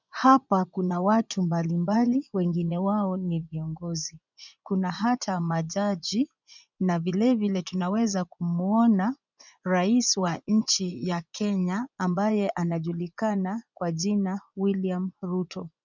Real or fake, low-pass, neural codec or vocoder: fake; 7.2 kHz; vocoder, 24 kHz, 100 mel bands, Vocos